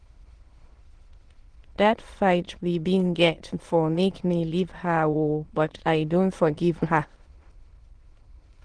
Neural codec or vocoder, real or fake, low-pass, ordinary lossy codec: autoencoder, 22.05 kHz, a latent of 192 numbers a frame, VITS, trained on many speakers; fake; 9.9 kHz; Opus, 16 kbps